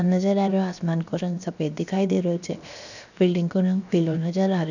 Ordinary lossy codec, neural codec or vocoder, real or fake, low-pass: none; codec, 16 kHz, 0.7 kbps, FocalCodec; fake; 7.2 kHz